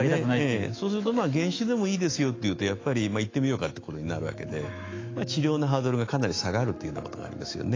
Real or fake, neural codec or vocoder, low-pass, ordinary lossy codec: fake; autoencoder, 48 kHz, 128 numbers a frame, DAC-VAE, trained on Japanese speech; 7.2 kHz; AAC, 32 kbps